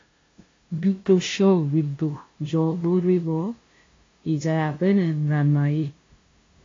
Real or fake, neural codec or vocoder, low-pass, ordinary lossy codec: fake; codec, 16 kHz, 0.5 kbps, FunCodec, trained on LibriTTS, 25 frames a second; 7.2 kHz; AAC, 32 kbps